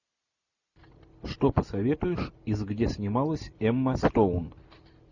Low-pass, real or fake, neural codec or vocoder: 7.2 kHz; real; none